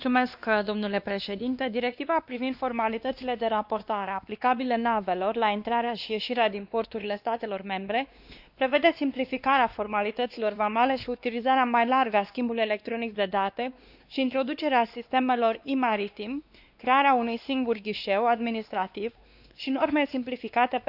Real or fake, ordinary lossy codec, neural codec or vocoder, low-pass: fake; none; codec, 16 kHz, 2 kbps, X-Codec, WavLM features, trained on Multilingual LibriSpeech; 5.4 kHz